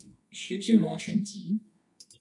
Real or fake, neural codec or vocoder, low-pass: fake; codec, 24 kHz, 0.9 kbps, WavTokenizer, medium music audio release; 10.8 kHz